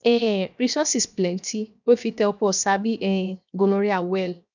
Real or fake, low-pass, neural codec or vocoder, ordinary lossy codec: fake; 7.2 kHz; codec, 16 kHz, 0.7 kbps, FocalCodec; none